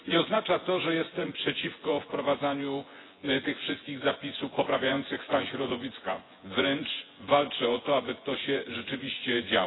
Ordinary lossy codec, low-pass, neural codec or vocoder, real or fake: AAC, 16 kbps; 7.2 kHz; vocoder, 24 kHz, 100 mel bands, Vocos; fake